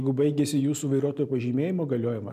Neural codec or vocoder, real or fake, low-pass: none; real; 14.4 kHz